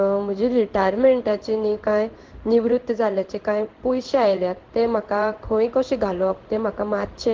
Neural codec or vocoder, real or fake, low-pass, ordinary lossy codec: codec, 16 kHz in and 24 kHz out, 1 kbps, XY-Tokenizer; fake; 7.2 kHz; Opus, 16 kbps